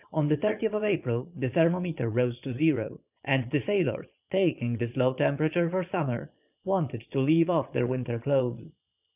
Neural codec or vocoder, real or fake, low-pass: vocoder, 22.05 kHz, 80 mel bands, Vocos; fake; 3.6 kHz